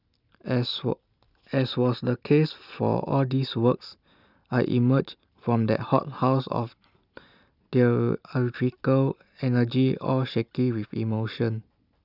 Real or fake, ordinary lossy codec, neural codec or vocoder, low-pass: real; none; none; 5.4 kHz